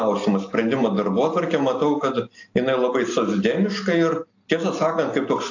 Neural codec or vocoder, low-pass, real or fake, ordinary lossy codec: none; 7.2 kHz; real; AAC, 48 kbps